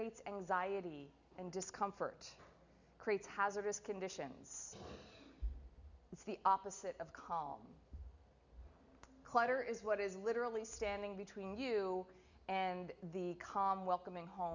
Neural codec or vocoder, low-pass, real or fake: none; 7.2 kHz; real